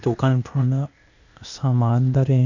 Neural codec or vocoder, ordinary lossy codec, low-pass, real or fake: codec, 16 kHz, 0.8 kbps, ZipCodec; none; 7.2 kHz; fake